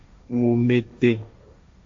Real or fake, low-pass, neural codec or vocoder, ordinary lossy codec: fake; 7.2 kHz; codec, 16 kHz, 1.1 kbps, Voila-Tokenizer; AAC, 48 kbps